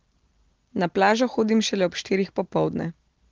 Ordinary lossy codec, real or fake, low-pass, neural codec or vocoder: Opus, 16 kbps; real; 7.2 kHz; none